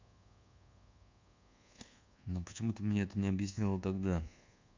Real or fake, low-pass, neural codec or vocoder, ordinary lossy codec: fake; 7.2 kHz; codec, 24 kHz, 1.2 kbps, DualCodec; MP3, 64 kbps